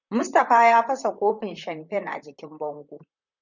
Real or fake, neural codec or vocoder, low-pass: fake; vocoder, 44.1 kHz, 128 mel bands, Pupu-Vocoder; 7.2 kHz